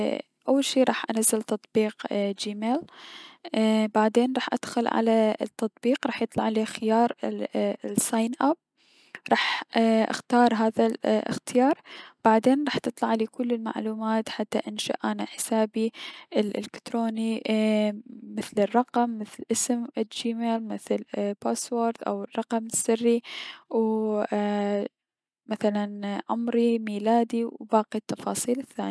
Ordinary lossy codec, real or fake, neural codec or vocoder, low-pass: none; real; none; none